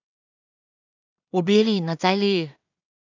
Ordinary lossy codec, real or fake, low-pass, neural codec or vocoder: none; fake; 7.2 kHz; codec, 16 kHz in and 24 kHz out, 0.4 kbps, LongCat-Audio-Codec, two codebook decoder